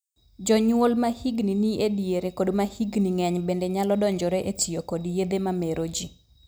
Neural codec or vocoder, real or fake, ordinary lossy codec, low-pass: none; real; none; none